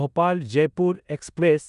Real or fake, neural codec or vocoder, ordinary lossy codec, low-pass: fake; codec, 16 kHz in and 24 kHz out, 0.9 kbps, LongCat-Audio-Codec, four codebook decoder; none; 10.8 kHz